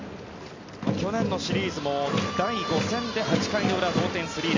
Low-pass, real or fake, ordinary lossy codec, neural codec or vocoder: 7.2 kHz; real; MP3, 48 kbps; none